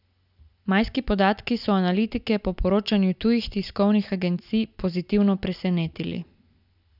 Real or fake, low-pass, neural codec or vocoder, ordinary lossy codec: real; 5.4 kHz; none; none